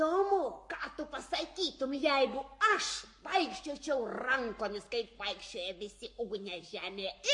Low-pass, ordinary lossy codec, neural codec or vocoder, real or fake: 10.8 kHz; MP3, 48 kbps; codec, 44.1 kHz, 7.8 kbps, Pupu-Codec; fake